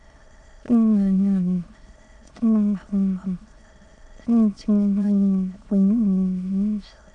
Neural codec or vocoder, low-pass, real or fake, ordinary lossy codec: autoencoder, 22.05 kHz, a latent of 192 numbers a frame, VITS, trained on many speakers; 9.9 kHz; fake; none